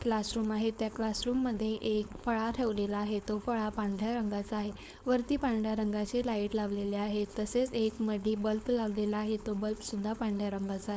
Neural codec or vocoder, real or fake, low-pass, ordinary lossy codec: codec, 16 kHz, 4.8 kbps, FACodec; fake; none; none